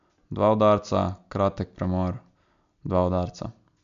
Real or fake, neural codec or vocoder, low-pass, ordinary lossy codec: real; none; 7.2 kHz; MP3, 64 kbps